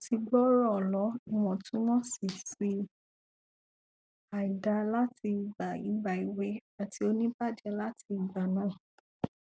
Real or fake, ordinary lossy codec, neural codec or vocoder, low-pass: real; none; none; none